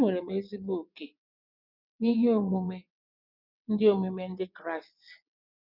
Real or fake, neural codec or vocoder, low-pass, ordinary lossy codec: fake; vocoder, 22.05 kHz, 80 mel bands, WaveNeXt; 5.4 kHz; none